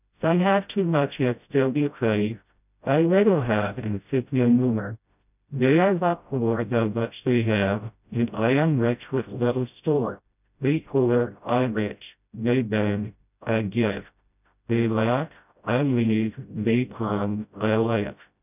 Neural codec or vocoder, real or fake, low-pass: codec, 16 kHz, 0.5 kbps, FreqCodec, smaller model; fake; 3.6 kHz